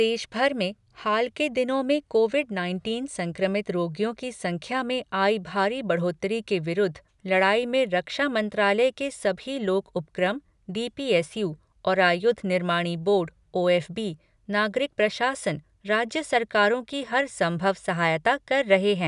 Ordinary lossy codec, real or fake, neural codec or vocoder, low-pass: none; real; none; 10.8 kHz